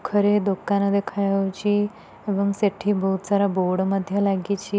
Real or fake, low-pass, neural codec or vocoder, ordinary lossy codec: real; none; none; none